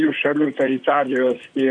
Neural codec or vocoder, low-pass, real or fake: vocoder, 44.1 kHz, 128 mel bands, Pupu-Vocoder; 9.9 kHz; fake